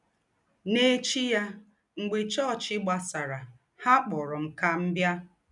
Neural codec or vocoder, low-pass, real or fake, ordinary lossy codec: none; 10.8 kHz; real; none